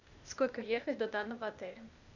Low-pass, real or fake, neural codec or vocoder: 7.2 kHz; fake; codec, 16 kHz, 0.8 kbps, ZipCodec